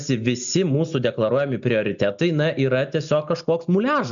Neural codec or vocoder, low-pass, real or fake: none; 7.2 kHz; real